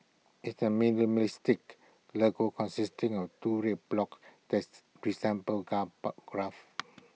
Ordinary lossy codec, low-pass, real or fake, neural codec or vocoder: none; none; real; none